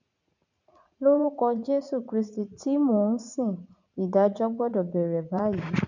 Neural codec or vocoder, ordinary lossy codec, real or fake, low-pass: vocoder, 44.1 kHz, 80 mel bands, Vocos; none; fake; 7.2 kHz